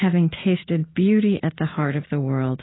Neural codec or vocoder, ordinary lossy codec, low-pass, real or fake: none; AAC, 16 kbps; 7.2 kHz; real